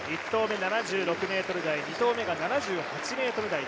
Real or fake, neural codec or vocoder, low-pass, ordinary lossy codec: real; none; none; none